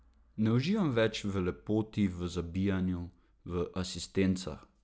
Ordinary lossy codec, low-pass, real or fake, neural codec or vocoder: none; none; real; none